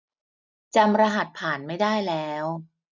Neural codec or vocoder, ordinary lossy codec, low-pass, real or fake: none; none; 7.2 kHz; real